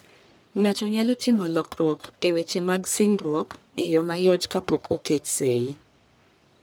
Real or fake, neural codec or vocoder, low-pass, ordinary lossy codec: fake; codec, 44.1 kHz, 1.7 kbps, Pupu-Codec; none; none